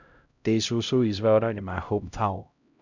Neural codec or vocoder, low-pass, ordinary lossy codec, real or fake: codec, 16 kHz, 0.5 kbps, X-Codec, HuBERT features, trained on LibriSpeech; 7.2 kHz; none; fake